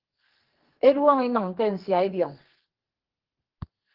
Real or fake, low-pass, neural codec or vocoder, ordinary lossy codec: fake; 5.4 kHz; codec, 44.1 kHz, 2.6 kbps, SNAC; Opus, 16 kbps